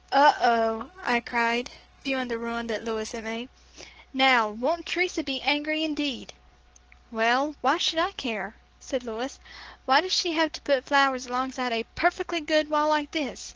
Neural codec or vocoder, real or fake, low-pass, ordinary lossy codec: codec, 44.1 kHz, 7.8 kbps, DAC; fake; 7.2 kHz; Opus, 16 kbps